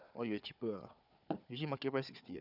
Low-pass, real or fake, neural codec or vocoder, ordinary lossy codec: 5.4 kHz; fake; codec, 16 kHz, 4 kbps, FunCodec, trained on Chinese and English, 50 frames a second; none